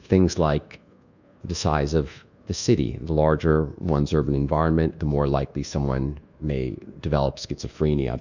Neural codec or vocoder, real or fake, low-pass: codec, 24 kHz, 1.2 kbps, DualCodec; fake; 7.2 kHz